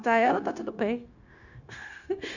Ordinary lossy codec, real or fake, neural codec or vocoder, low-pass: none; fake; codec, 16 kHz, 0.9 kbps, LongCat-Audio-Codec; 7.2 kHz